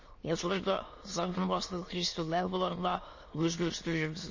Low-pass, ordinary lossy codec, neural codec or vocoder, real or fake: 7.2 kHz; MP3, 32 kbps; autoencoder, 22.05 kHz, a latent of 192 numbers a frame, VITS, trained on many speakers; fake